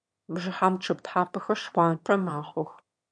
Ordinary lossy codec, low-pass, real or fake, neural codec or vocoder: MP3, 64 kbps; 9.9 kHz; fake; autoencoder, 22.05 kHz, a latent of 192 numbers a frame, VITS, trained on one speaker